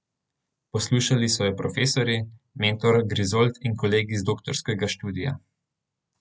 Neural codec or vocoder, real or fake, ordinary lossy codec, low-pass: none; real; none; none